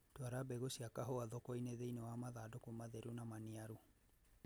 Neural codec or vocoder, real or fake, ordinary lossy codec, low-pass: none; real; none; none